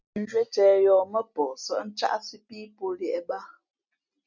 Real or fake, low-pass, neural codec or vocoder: real; 7.2 kHz; none